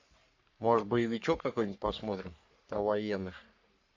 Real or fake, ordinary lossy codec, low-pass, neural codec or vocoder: fake; AAC, 48 kbps; 7.2 kHz; codec, 44.1 kHz, 3.4 kbps, Pupu-Codec